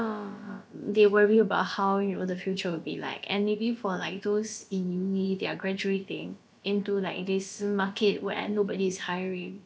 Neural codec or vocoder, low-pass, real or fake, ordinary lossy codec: codec, 16 kHz, about 1 kbps, DyCAST, with the encoder's durations; none; fake; none